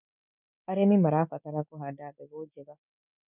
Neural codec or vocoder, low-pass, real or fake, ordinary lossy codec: none; 3.6 kHz; real; none